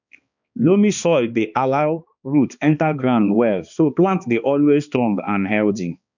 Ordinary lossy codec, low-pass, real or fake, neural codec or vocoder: none; 7.2 kHz; fake; codec, 16 kHz, 2 kbps, X-Codec, HuBERT features, trained on balanced general audio